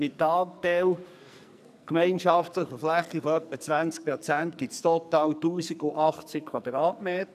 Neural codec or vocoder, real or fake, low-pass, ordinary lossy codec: codec, 44.1 kHz, 2.6 kbps, SNAC; fake; 14.4 kHz; none